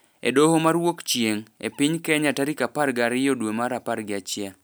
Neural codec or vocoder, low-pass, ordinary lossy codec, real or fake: none; none; none; real